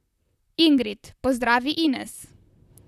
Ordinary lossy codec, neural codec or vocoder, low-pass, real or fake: none; vocoder, 44.1 kHz, 128 mel bands, Pupu-Vocoder; 14.4 kHz; fake